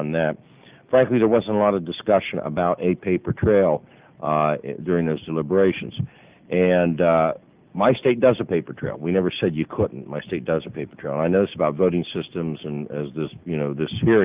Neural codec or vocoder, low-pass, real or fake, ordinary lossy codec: none; 3.6 kHz; real; Opus, 32 kbps